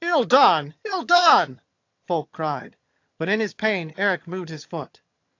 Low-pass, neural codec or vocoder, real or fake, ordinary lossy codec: 7.2 kHz; vocoder, 22.05 kHz, 80 mel bands, HiFi-GAN; fake; AAC, 48 kbps